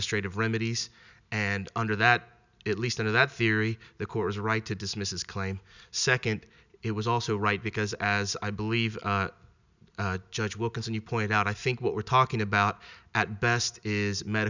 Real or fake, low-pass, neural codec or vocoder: real; 7.2 kHz; none